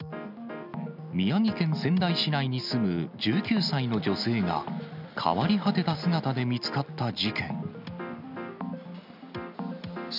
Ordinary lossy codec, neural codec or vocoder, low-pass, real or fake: none; none; 5.4 kHz; real